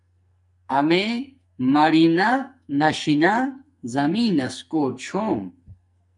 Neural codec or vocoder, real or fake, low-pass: codec, 44.1 kHz, 2.6 kbps, SNAC; fake; 10.8 kHz